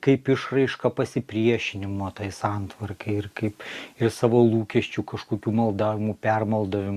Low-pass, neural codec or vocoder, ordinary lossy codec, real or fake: 14.4 kHz; none; Opus, 64 kbps; real